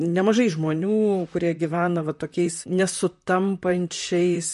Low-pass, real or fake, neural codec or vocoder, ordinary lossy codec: 14.4 kHz; fake; vocoder, 44.1 kHz, 128 mel bands, Pupu-Vocoder; MP3, 48 kbps